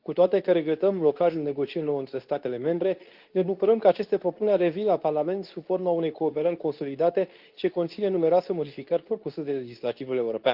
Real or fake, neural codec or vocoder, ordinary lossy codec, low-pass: fake; codec, 24 kHz, 0.9 kbps, WavTokenizer, medium speech release version 2; Opus, 24 kbps; 5.4 kHz